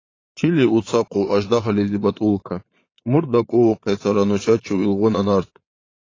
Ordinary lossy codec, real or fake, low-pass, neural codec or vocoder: AAC, 32 kbps; fake; 7.2 kHz; vocoder, 22.05 kHz, 80 mel bands, Vocos